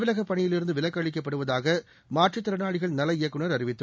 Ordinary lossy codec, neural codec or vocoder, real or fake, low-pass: none; none; real; none